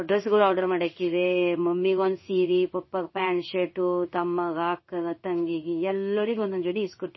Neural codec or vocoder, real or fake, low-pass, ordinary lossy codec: codec, 16 kHz in and 24 kHz out, 1 kbps, XY-Tokenizer; fake; 7.2 kHz; MP3, 24 kbps